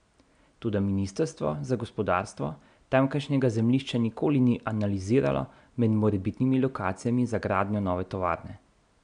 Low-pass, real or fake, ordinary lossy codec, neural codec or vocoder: 9.9 kHz; real; none; none